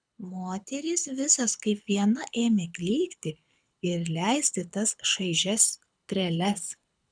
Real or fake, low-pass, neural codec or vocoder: fake; 9.9 kHz; codec, 24 kHz, 6 kbps, HILCodec